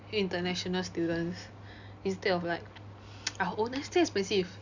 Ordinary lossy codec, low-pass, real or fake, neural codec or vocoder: none; 7.2 kHz; real; none